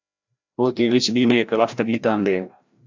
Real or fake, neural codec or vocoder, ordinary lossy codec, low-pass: fake; codec, 16 kHz, 1 kbps, FreqCodec, larger model; MP3, 64 kbps; 7.2 kHz